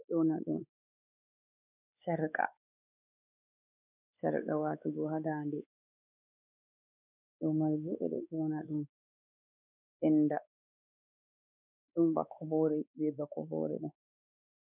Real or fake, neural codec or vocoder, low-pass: fake; codec, 16 kHz, 4 kbps, X-Codec, WavLM features, trained on Multilingual LibriSpeech; 3.6 kHz